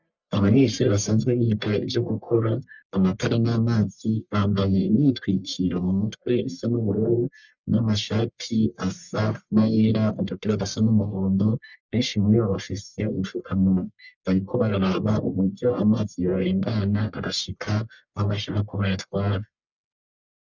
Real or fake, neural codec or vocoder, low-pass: fake; codec, 44.1 kHz, 1.7 kbps, Pupu-Codec; 7.2 kHz